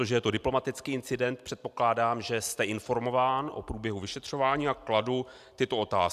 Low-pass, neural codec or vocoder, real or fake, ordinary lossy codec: 14.4 kHz; none; real; AAC, 96 kbps